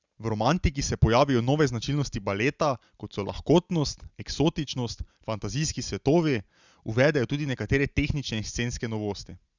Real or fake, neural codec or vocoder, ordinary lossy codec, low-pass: real; none; Opus, 64 kbps; 7.2 kHz